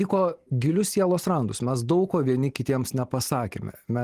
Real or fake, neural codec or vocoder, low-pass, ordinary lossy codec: real; none; 14.4 kHz; Opus, 24 kbps